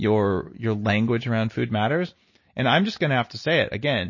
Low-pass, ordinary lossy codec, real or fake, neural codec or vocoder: 7.2 kHz; MP3, 32 kbps; real; none